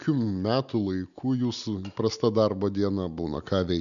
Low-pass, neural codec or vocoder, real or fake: 7.2 kHz; none; real